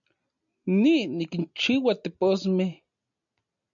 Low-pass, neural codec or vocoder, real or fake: 7.2 kHz; none; real